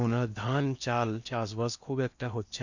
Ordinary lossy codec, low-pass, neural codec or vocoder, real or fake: none; 7.2 kHz; codec, 16 kHz in and 24 kHz out, 0.8 kbps, FocalCodec, streaming, 65536 codes; fake